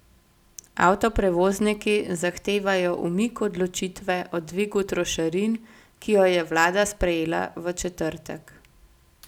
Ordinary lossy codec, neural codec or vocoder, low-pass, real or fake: none; none; 19.8 kHz; real